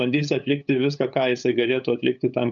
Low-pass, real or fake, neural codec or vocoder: 7.2 kHz; fake; codec, 16 kHz, 8 kbps, FunCodec, trained on LibriTTS, 25 frames a second